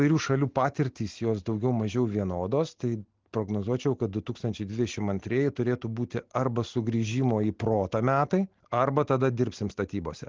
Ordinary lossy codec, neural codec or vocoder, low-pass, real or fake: Opus, 16 kbps; none; 7.2 kHz; real